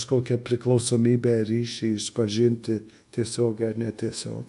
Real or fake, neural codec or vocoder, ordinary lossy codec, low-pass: fake; codec, 24 kHz, 1.2 kbps, DualCodec; AAC, 64 kbps; 10.8 kHz